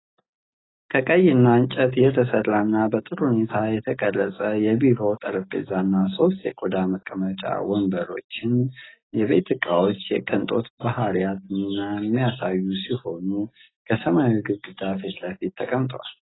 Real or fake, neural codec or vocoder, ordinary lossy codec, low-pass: real; none; AAC, 16 kbps; 7.2 kHz